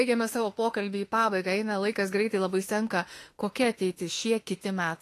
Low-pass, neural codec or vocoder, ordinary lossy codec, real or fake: 14.4 kHz; autoencoder, 48 kHz, 32 numbers a frame, DAC-VAE, trained on Japanese speech; AAC, 48 kbps; fake